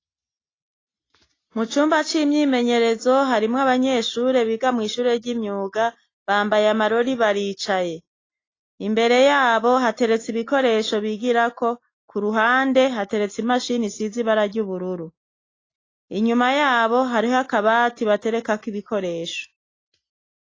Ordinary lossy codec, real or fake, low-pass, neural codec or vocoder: AAC, 32 kbps; real; 7.2 kHz; none